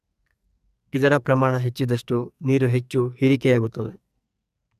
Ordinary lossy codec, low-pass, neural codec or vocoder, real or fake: none; 14.4 kHz; codec, 44.1 kHz, 2.6 kbps, SNAC; fake